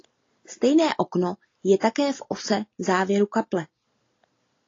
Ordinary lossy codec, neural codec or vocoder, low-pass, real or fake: AAC, 32 kbps; none; 7.2 kHz; real